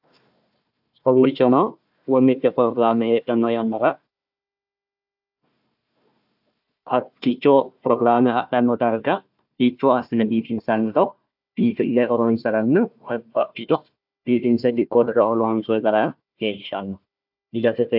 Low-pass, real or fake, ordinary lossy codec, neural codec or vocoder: 5.4 kHz; fake; AAC, 48 kbps; codec, 16 kHz, 1 kbps, FunCodec, trained on Chinese and English, 50 frames a second